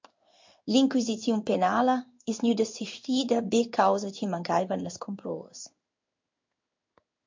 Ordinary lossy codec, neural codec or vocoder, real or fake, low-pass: MP3, 48 kbps; codec, 16 kHz in and 24 kHz out, 1 kbps, XY-Tokenizer; fake; 7.2 kHz